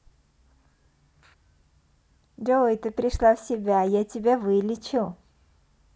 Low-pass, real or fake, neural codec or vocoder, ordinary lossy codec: none; real; none; none